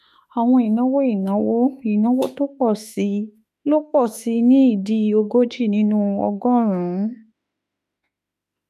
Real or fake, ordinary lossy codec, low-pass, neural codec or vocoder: fake; none; 14.4 kHz; autoencoder, 48 kHz, 32 numbers a frame, DAC-VAE, trained on Japanese speech